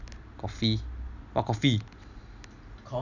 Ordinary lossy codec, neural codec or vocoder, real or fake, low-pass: none; none; real; 7.2 kHz